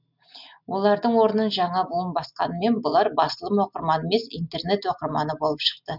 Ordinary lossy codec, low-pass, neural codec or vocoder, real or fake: none; 5.4 kHz; none; real